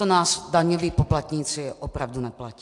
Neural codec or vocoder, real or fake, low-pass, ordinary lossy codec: none; real; 10.8 kHz; AAC, 64 kbps